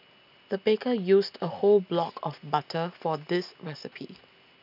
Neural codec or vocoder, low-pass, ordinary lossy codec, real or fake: none; 5.4 kHz; none; real